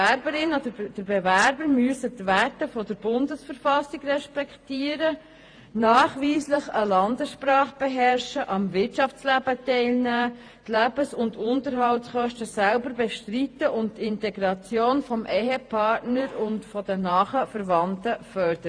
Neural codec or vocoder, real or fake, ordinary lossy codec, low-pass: vocoder, 48 kHz, 128 mel bands, Vocos; fake; AAC, 48 kbps; 9.9 kHz